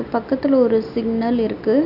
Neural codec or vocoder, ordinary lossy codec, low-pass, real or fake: none; none; 5.4 kHz; real